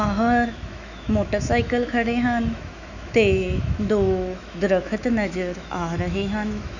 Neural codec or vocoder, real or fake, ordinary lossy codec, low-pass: autoencoder, 48 kHz, 128 numbers a frame, DAC-VAE, trained on Japanese speech; fake; none; 7.2 kHz